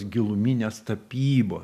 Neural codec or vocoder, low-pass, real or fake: none; 14.4 kHz; real